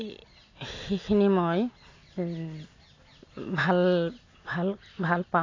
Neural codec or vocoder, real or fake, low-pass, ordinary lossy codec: none; real; 7.2 kHz; AAC, 32 kbps